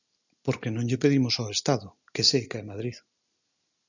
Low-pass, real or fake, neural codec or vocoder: 7.2 kHz; real; none